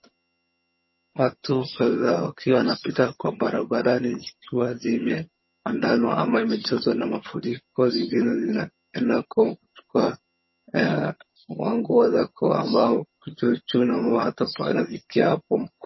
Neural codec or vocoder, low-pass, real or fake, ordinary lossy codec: vocoder, 22.05 kHz, 80 mel bands, HiFi-GAN; 7.2 kHz; fake; MP3, 24 kbps